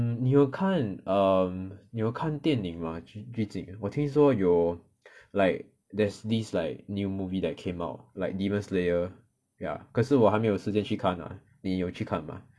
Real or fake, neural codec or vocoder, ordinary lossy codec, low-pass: real; none; none; none